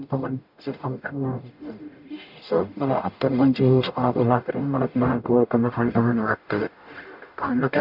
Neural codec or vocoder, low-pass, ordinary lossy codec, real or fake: codec, 44.1 kHz, 0.9 kbps, DAC; 5.4 kHz; none; fake